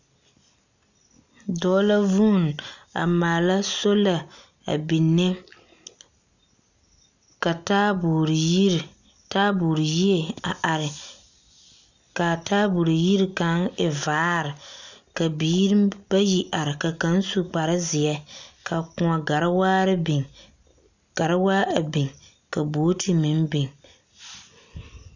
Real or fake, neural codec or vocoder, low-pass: real; none; 7.2 kHz